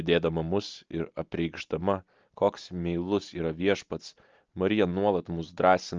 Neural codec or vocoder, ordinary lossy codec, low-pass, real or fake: none; Opus, 24 kbps; 7.2 kHz; real